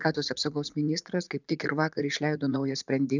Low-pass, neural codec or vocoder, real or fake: 7.2 kHz; vocoder, 44.1 kHz, 80 mel bands, Vocos; fake